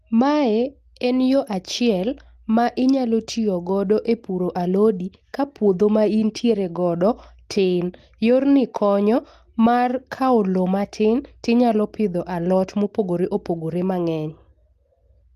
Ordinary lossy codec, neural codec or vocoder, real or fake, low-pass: Opus, 24 kbps; none; real; 14.4 kHz